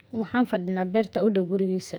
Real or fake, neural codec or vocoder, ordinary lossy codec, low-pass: fake; codec, 44.1 kHz, 2.6 kbps, SNAC; none; none